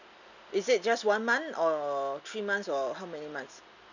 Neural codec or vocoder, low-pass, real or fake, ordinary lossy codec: none; 7.2 kHz; real; none